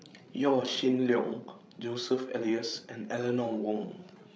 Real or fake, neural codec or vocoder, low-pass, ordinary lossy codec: fake; codec, 16 kHz, 16 kbps, FreqCodec, larger model; none; none